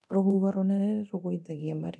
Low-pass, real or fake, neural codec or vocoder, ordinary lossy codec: none; fake; codec, 24 kHz, 0.9 kbps, DualCodec; none